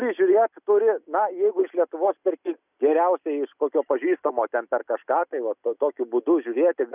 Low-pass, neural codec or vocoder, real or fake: 3.6 kHz; none; real